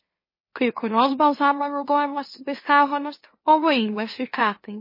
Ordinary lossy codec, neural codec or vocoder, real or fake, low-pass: MP3, 24 kbps; autoencoder, 44.1 kHz, a latent of 192 numbers a frame, MeloTTS; fake; 5.4 kHz